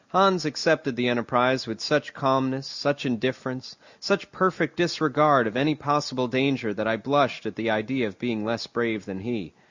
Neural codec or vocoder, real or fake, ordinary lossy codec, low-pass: none; real; Opus, 64 kbps; 7.2 kHz